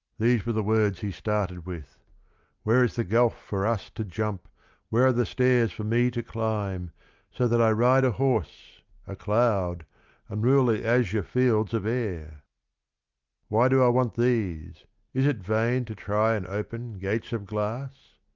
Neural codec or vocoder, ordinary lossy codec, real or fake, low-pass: none; Opus, 24 kbps; real; 7.2 kHz